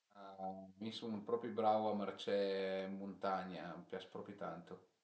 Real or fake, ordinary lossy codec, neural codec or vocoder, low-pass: real; none; none; none